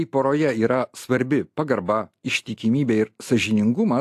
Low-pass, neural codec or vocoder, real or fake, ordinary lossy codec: 14.4 kHz; none; real; AAC, 64 kbps